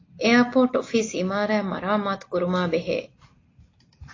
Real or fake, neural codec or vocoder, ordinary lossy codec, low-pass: real; none; AAC, 32 kbps; 7.2 kHz